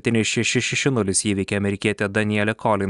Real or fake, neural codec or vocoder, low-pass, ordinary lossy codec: real; none; 10.8 kHz; Opus, 64 kbps